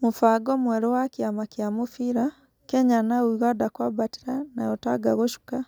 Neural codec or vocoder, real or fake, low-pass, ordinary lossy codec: none; real; none; none